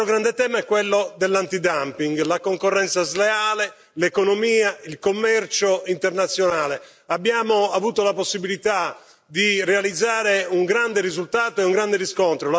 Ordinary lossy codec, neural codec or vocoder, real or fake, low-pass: none; none; real; none